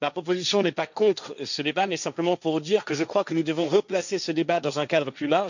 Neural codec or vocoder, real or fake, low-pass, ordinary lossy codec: codec, 16 kHz, 1.1 kbps, Voila-Tokenizer; fake; 7.2 kHz; none